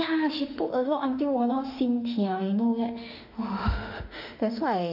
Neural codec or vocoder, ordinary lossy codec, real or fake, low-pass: autoencoder, 48 kHz, 32 numbers a frame, DAC-VAE, trained on Japanese speech; none; fake; 5.4 kHz